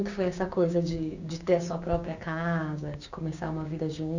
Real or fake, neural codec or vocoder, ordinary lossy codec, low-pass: fake; codec, 16 kHz, 6 kbps, DAC; none; 7.2 kHz